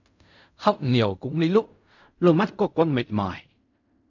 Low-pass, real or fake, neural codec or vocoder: 7.2 kHz; fake; codec, 16 kHz in and 24 kHz out, 0.4 kbps, LongCat-Audio-Codec, fine tuned four codebook decoder